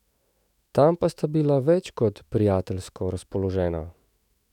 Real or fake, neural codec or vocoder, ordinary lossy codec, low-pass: fake; autoencoder, 48 kHz, 128 numbers a frame, DAC-VAE, trained on Japanese speech; none; 19.8 kHz